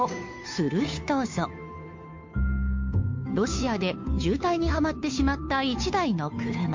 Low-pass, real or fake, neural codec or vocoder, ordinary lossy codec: 7.2 kHz; fake; codec, 16 kHz, 2 kbps, FunCodec, trained on Chinese and English, 25 frames a second; MP3, 48 kbps